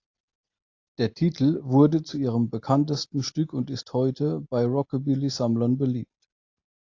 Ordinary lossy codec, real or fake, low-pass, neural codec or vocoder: AAC, 48 kbps; real; 7.2 kHz; none